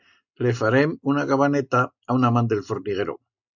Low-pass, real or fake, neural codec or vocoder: 7.2 kHz; real; none